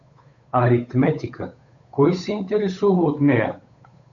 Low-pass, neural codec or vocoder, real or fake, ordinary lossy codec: 7.2 kHz; codec, 16 kHz, 8 kbps, FunCodec, trained on Chinese and English, 25 frames a second; fake; MP3, 64 kbps